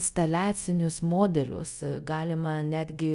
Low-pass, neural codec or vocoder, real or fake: 10.8 kHz; codec, 24 kHz, 0.5 kbps, DualCodec; fake